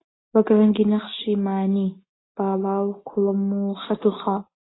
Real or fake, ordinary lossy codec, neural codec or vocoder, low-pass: real; AAC, 16 kbps; none; 7.2 kHz